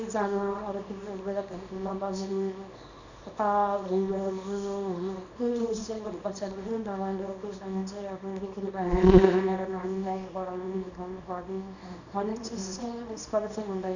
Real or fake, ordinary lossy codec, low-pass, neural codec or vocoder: fake; none; 7.2 kHz; codec, 24 kHz, 0.9 kbps, WavTokenizer, small release